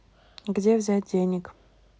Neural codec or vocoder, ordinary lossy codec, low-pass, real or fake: none; none; none; real